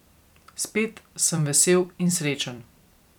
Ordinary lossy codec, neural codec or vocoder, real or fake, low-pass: none; vocoder, 44.1 kHz, 128 mel bands every 256 samples, BigVGAN v2; fake; 19.8 kHz